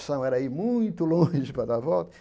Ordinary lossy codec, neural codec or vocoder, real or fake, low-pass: none; none; real; none